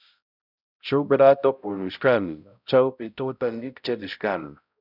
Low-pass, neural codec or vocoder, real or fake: 5.4 kHz; codec, 16 kHz, 0.5 kbps, X-Codec, HuBERT features, trained on balanced general audio; fake